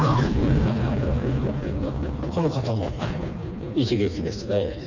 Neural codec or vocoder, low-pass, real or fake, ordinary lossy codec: codec, 16 kHz, 2 kbps, FreqCodec, smaller model; 7.2 kHz; fake; none